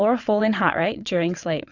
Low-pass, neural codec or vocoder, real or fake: 7.2 kHz; vocoder, 22.05 kHz, 80 mel bands, WaveNeXt; fake